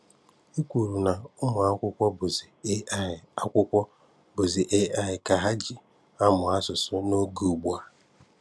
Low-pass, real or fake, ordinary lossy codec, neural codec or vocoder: none; real; none; none